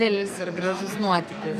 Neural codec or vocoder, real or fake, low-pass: codec, 44.1 kHz, 2.6 kbps, SNAC; fake; 14.4 kHz